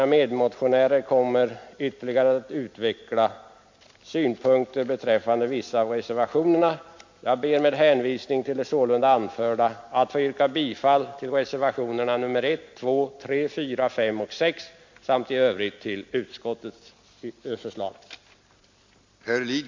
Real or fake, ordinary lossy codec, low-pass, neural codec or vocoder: real; MP3, 48 kbps; 7.2 kHz; none